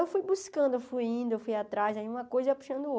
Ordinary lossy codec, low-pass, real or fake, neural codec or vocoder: none; none; real; none